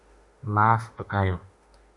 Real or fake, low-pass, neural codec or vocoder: fake; 10.8 kHz; autoencoder, 48 kHz, 32 numbers a frame, DAC-VAE, trained on Japanese speech